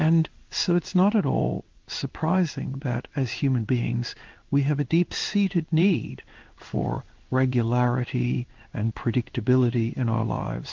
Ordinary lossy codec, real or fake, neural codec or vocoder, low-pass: Opus, 32 kbps; fake; codec, 16 kHz in and 24 kHz out, 1 kbps, XY-Tokenizer; 7.2 kHz